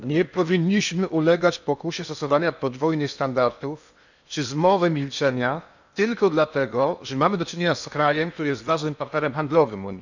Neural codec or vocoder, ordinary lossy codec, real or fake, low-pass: codec, 16 kHz in and 24 kHz out, 0.8 kbps, FocalCodec, streaming, 65536 codes; none; fake; 7.2 kHz